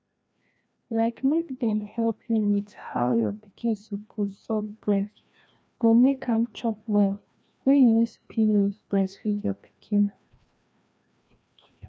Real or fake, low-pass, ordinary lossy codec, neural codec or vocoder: fake; none; none; codec, 16 kHz, 1 kbps, FreqCodec, larger model